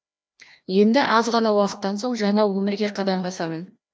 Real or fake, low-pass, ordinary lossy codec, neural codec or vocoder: fake; none; none; codec, 16 kHz, 1 kbps, FreqCodec, larger model